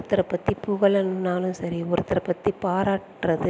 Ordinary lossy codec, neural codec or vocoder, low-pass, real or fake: none; none; none; real